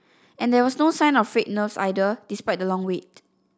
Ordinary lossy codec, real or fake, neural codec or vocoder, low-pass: none; real; none; none